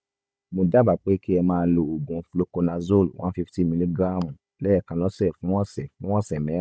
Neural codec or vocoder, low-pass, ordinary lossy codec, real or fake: codec, 16 kHz, 16 kbps, FunCodec, trained on Chinese and English, 50 frames a second; none; none; fake